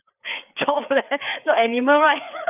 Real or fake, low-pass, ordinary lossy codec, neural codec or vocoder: fake; 3.6 kHz; none; codec, 16 kHz, 4 kbps, FreqCodec, larger model